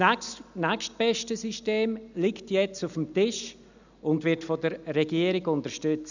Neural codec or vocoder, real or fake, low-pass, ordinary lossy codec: none; real; 7.2 kHz; none